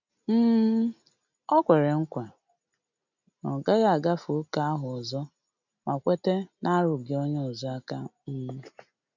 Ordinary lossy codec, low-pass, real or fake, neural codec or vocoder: none; 7.2 kHz; real; none